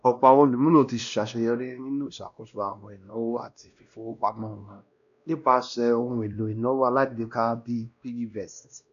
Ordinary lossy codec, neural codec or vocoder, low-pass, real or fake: none; codec, 16 kHz, 1 kbps, X-Codec, WavLM features, trained on Multilingual LibriSpeech; 7.2 kHz; fake